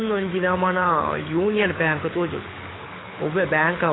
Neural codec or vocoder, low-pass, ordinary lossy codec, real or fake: codec, 24 kHz, 6 kbps, HILCodec; 7.2 kHz; AAC, 16 kbps; fake